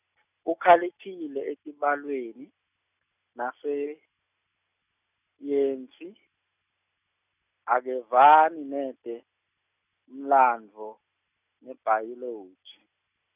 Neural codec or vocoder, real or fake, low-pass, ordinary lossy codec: none; real; 3.6 kHz; none